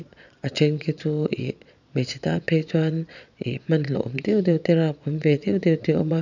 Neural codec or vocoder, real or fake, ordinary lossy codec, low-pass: vocoder, 22.05 kHz, 80 mel bands, Vocos; fake; none; 7.2 kHz